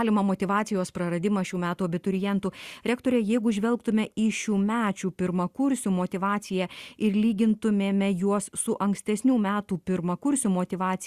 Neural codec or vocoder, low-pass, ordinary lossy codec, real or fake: none; 14.4 kHz; Opus, 64 kbps; real